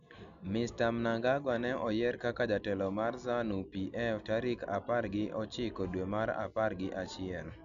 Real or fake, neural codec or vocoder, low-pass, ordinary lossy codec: real; none; 7.2 kHz; MP3, 96 kbps